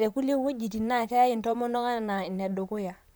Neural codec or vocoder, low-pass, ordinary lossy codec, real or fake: vocoder, 44.1 kHz, 128 mel bands, Pupu-Vocoder; none; none; fake